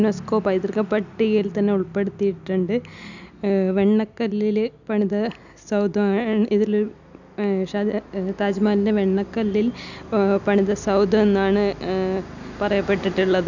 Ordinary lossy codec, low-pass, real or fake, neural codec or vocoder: none; 7.2 kHz; real; none